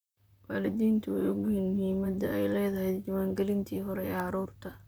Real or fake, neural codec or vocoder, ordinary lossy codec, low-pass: fake; vocoder, 44.1 kHz, 128 mel bands, Pupu-Vocoder; none; none